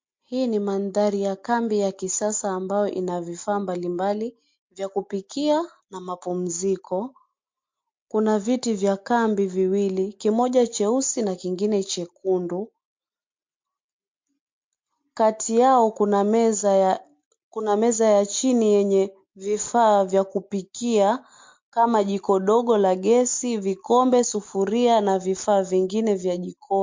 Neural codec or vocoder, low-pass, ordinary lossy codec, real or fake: none; 7.2 kHz; MP3, 48 kbps; real